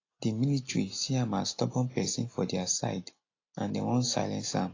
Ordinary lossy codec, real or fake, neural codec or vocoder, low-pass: AAC, 32 kbps; real; none; 7.2 kHz